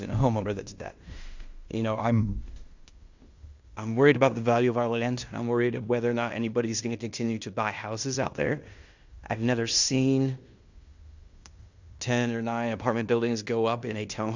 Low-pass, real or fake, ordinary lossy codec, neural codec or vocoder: 7.2 kHz; fake; Opus, 64 kbps; codec, 16 kHz in and 24 kHz out, 0.9 kbps, LongCat-Audio-Codec, fine tuned four codebook decoder